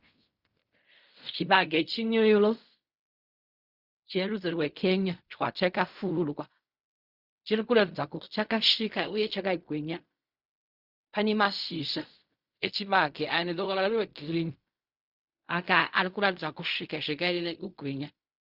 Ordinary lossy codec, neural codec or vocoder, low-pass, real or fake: Opus, 64 kbps; codec, 16 kHz in and 24 kHz out, 0.4 kbps, LongCat-Audio-Codec, fine tuned four codebook decoder; 5.4 kHz; fake